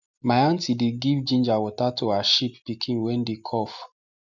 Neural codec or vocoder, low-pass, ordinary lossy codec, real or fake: none; 7.2 kHz; none; real